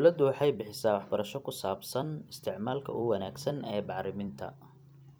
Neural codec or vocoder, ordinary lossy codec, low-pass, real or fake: vocoder, 44.1 kHz, 128 mel bands every 256 samples, BigVGAN v2; none; none; fake